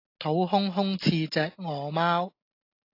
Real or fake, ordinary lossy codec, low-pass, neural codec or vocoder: real; AAC, 32 kbps; 5.4 kHz; none